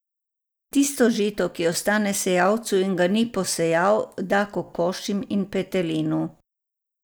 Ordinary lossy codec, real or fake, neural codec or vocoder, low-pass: none; real; none; none